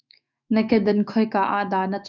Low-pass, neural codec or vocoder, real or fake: 7.2 kHz; autoencoder, 48 kHz, 128 numbers a frame, DAC-VAE, trained on Japanese speech; fake